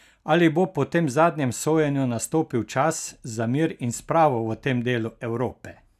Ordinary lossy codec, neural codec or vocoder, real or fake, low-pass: none; none; real; 14.4 kHz